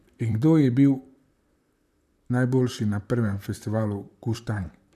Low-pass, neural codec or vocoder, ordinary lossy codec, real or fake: 14.4 kHz; vocoder, 44.1 kHz, 128 mel bands, Pupu-Vocoder; none; fake